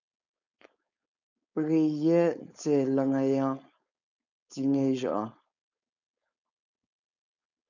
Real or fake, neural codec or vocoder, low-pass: fake; codec, 16 kHz, 4.8 kbps, FACodec; 7.2 kHz